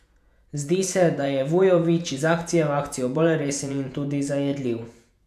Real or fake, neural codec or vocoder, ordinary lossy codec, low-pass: fake; vocoder, 48 kHz, 128 mel bands, Vocos; none; 14.4 kHz